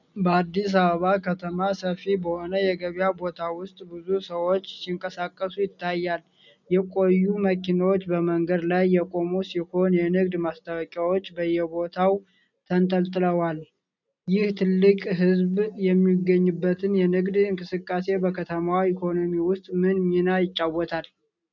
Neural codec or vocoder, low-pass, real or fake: none; 7.2 kHz; real